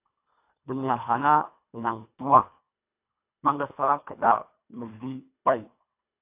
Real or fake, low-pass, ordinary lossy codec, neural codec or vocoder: fake; 3.6 kHz; AAC, 32 kbps; codec, 24 kHz, 1.5 kbps, HILCodec